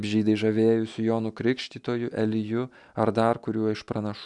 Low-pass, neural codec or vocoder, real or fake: 10.8 kHz; none; real